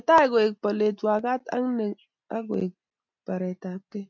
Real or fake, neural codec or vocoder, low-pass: real; none; 7.2 kHz